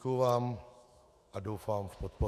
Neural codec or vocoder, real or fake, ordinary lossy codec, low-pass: autoencoder, 48 kHz, 128 numbers a frame, DAC-VAE, trained on Japanese speech; fake; Opus, 64 kbps; 14.4 kHz